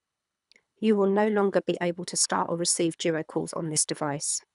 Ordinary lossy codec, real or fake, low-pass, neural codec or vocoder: none; fake; 10.8 kHz; codec, 24 kHz, 3 kbps, HILCodec